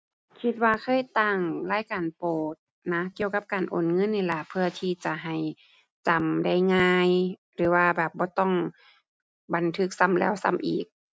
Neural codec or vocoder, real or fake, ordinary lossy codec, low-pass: none; real; none; none